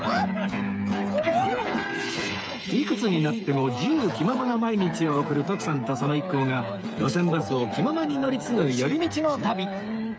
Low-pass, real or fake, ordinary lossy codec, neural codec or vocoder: none; fake; none; codec, 16 kHz, 8 kbps, FreqCodec, smaller model